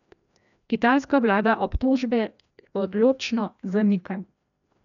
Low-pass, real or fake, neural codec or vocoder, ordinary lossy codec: 7.2 kHz; fake; codec, 16 kHz, 1 kbps, FreqCodec, larger model; none